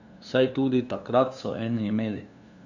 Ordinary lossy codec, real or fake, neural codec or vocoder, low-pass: none; fake; codec, 16 kHz, 2 kbps, FunCodec, trained on LibriTTS, 25 frames a second; 7.2 kHz